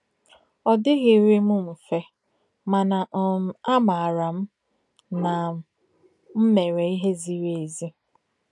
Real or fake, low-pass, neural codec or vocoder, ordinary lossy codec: real; 10.8 kHz; none; none